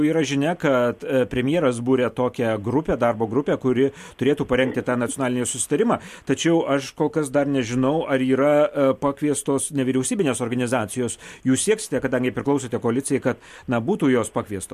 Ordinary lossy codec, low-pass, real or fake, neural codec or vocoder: MP3, 64 kbps; 14.4 kHz; real; none